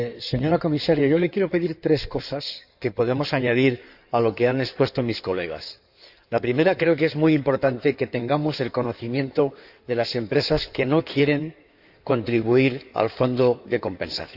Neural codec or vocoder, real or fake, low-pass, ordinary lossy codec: codec, 16 kHz in and 24 kHz out, 2.2 kbps, FireRedTTS-2 codec; fake; 5.4 kHz; none